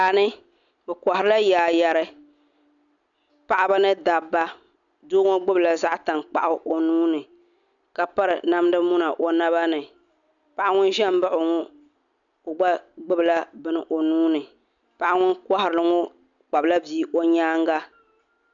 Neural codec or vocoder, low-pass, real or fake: none; 7.2 kHz; real